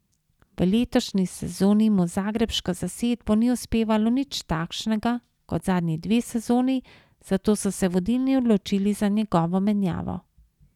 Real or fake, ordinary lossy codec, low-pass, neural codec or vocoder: real; none; 19.8 kHz; none